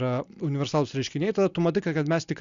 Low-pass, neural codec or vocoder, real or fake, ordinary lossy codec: 7.2 kHz; none; real; Opus, 64 kbps